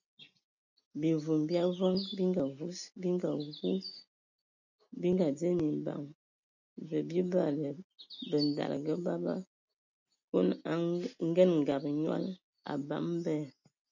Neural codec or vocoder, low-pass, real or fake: none; 7.2 kHz; real